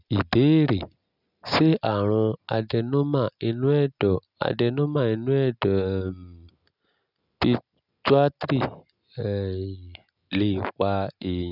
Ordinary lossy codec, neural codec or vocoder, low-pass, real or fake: none; none; 5.4 kHz; real